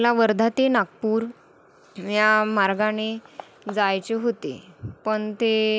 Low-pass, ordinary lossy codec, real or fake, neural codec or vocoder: none; none; real; none